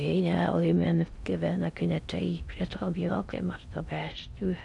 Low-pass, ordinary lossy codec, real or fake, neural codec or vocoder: 10.8 kHz; none; fake; codec, 16 kHz in and 24 kHz out, 0.6 kbps, FocalCodec, streaming, 4096 codes